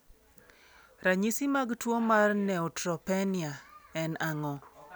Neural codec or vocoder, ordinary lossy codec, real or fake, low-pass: none; none; real; none